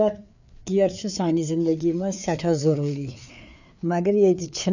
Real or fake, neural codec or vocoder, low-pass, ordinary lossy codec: fake; codec, 16 kHz, 4 kbps, FreqCodec, larger model; 7.2 kHz; none